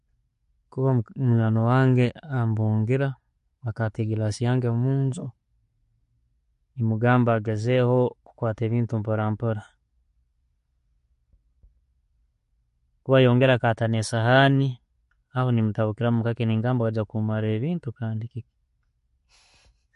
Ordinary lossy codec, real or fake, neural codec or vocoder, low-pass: MP3, 48 kbps; real; none; 14.4 kHz